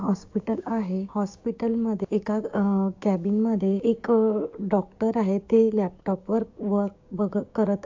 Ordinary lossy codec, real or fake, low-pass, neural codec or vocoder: AAC, 48 kbps; fake; 7.2 kHz; codec, 16 kHz, 6 kbps, DAC